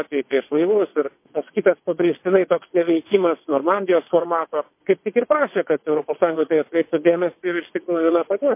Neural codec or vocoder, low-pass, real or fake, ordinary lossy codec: vocoder, 22.05 kHz, 80 mel bands, WaveNeXt; 3.6 kHz; fake; MP3, 24 kbps